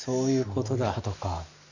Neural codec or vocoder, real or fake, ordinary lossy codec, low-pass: none; real; none; 7.2 kHz